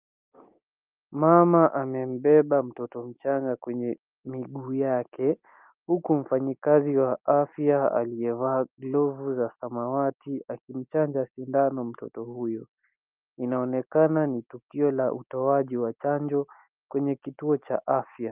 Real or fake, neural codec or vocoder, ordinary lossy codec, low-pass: real; none; Opus, 24 kbps; 3.6 kHz